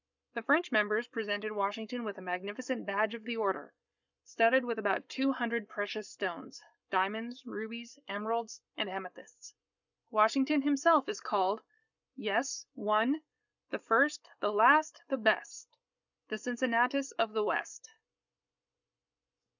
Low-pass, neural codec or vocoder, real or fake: 7.2 kHz; codec, 44.1 kHz, 7.8 kbps, Pupu-Codec; fake